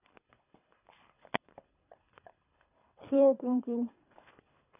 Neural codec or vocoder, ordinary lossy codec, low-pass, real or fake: codec, 24 kHz, 3 kbps, HILCodec; none; 3.6 kHz; fake